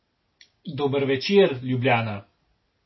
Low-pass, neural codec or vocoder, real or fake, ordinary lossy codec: 7.2 kHz; none; real; MP3, 24 kbps